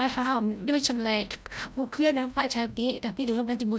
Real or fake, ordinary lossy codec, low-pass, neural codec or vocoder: fake; none; none; codec, 16 kHz, 0.5 kbps, FreqCodec, larger model